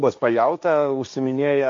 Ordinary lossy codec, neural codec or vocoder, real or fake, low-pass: MP3, 48 kbps; codec, 16 kHz, 1.1 kbps, Voila-Tokenizer; fake; 7.2 kHz